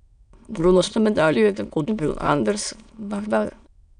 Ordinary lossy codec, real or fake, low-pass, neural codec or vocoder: none; fake; 9.9 kHz; autoencoder, 22.05 kHz, a latent of 192 numbers a frame, VITS, trained on many speakers